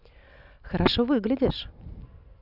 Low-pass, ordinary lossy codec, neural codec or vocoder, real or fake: 5.4 kHz; none; none; real